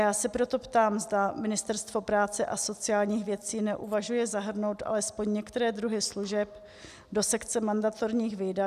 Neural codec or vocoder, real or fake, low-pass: none; real; 14.4 kHz